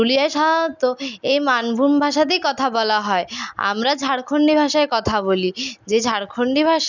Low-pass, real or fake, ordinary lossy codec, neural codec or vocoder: 7.2 kHz; real; none; none